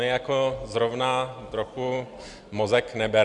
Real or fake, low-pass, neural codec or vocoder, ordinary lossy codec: real; 10.8 kHz; none; Opus, 64 kbps